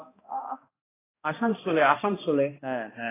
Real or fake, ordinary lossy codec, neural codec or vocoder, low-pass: fake; AAC, 16 kbps; codec, 16 kHz, 1 kbps, X-Codec, HuBERT features, trained on balanced general audio; 3.6 kHz